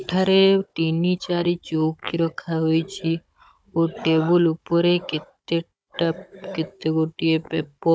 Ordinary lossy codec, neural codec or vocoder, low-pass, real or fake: none; codec, 16 kHz, 16 kbps, FunCodec, trained on Chinese and English, 50 frames a second; none; fake